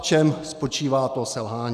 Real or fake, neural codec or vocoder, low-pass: real; none; 14.4 kHz